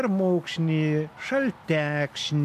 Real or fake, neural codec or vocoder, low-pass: real; none; 14.4 kHz